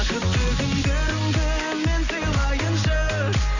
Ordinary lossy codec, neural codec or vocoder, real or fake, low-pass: none; none; real; 7.2 kHz